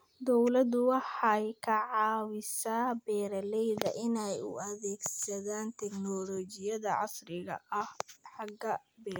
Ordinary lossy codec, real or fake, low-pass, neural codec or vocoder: none; real; none; none